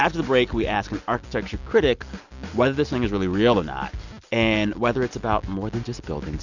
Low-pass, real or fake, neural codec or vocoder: 7.2 kHz; real; none